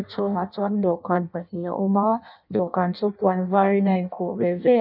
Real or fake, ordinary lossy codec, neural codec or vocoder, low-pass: fake; none; codec, 16 kHz in and 24 kHz out, 0.6 kbps, FireRedTTS-2 codec; 5.4 kHz